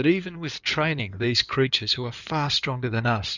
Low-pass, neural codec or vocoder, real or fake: 7.2 kHz; codec, 16 kHz in and 24 kHz out, 2.2 kbps, FireRedTTS-2 codec; fake